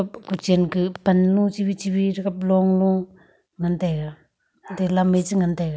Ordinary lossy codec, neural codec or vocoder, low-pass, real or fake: none; none; none; real